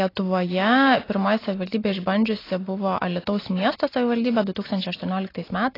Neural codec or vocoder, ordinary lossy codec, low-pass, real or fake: none; AAC, 24 kbps; 5.4 kHz; real